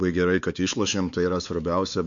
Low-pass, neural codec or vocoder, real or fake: 7.2 kHz; codec, 16 kHz, 4 kbps, X-Codec, WavLM features, trained on Multilingual LibriSpeech; fake